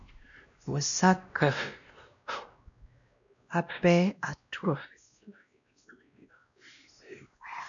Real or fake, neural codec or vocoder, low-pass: fake; codec, 16 kHz, 1 kbps, X-Codec, WavLM features, trained on Multilingual LibriSpeech; 7.2 kHz